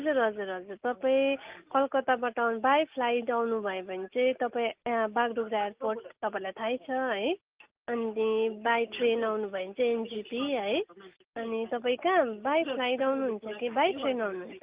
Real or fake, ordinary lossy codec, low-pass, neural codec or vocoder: real; Opus, 24 kbps; 3.6 kHz; none